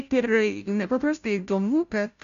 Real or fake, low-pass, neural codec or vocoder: fake; 7.2 kHz; codec, 16 kHz, 0.5 kbps, FunCodec, trained on Chinese and English, 25 frames a second